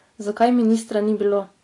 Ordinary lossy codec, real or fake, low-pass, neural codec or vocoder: AAC, 48 kbps; real; 10.8 kHz; none